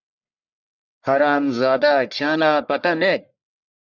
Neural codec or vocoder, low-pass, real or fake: codec, 44.1 kHz, 1.7 kbps, Pupu-Codec; 7.2 kHz; fake